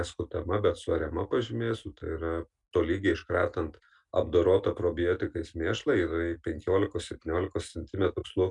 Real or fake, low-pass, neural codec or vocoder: real; 10.8 kHz; none